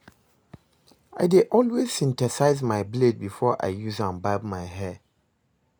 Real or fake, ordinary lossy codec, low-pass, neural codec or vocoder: real; none; none; none